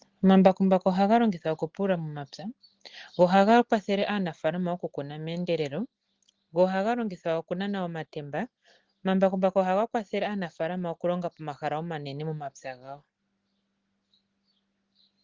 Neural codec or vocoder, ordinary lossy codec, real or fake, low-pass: none; Opus, 16 kbps; real; 7.2 kHz